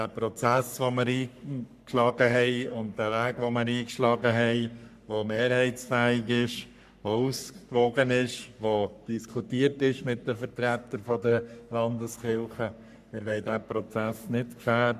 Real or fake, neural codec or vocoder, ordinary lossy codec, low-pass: fake; codec, 44.1 kHz, 3.4 kbps, Pupu-Codec; none; 14.4 kHz